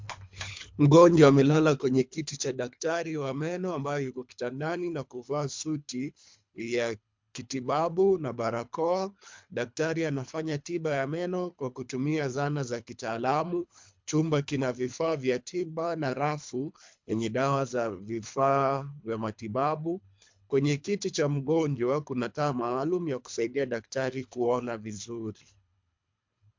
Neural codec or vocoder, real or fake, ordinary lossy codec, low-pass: codec, 24 kHz, 3 kbps, HILCodec; fake; MP3, 64 kbps; 7.2 kHz